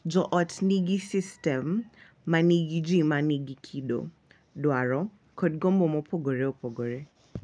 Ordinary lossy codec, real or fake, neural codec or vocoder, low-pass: none; real; none; 9.9 kHz